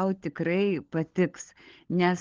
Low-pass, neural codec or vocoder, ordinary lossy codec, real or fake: 7.2 kHz; codec, 16 kHz, 16 kbps, FunCodec, trained on LibriTTS, 50 frames a second; Opus, 16 kbps; fake